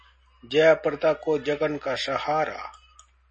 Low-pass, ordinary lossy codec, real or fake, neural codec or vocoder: 9.9 kHz; MP3, 32 kbps; real; none